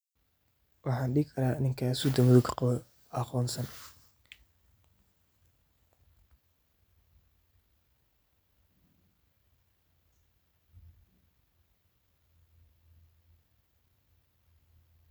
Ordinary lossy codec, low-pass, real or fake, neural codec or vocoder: none; none; real; none